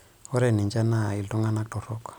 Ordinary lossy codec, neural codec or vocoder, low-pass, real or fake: none; none; none; real